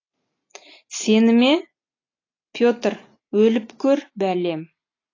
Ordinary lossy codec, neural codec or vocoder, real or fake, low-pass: AAC, 32 kbps; none; real; 7.2 kHz